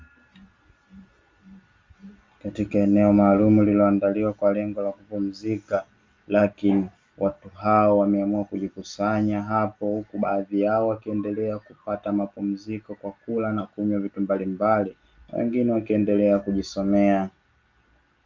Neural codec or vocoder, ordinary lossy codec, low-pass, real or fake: none; Opus, 32 kbps; 7.2 kHz; real